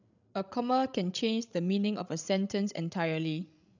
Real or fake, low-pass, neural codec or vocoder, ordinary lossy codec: fake; 7.2 kHz; codec, 16 kHz, 16 kbps, FreqCodec, larger model; none